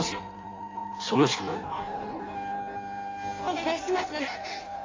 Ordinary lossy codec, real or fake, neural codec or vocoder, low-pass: AAC, 32 kbps; fake; codec, 16 kHz in and 24 kHz out, 0.6 kbps, FireRedTTS-2 codec; 7.2 kHz